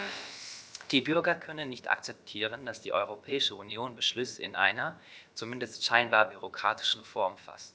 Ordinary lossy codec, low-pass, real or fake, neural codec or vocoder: none; none; fake; codec, 16 kHz, about 1 kbps, DyCAST, with the encoder's durations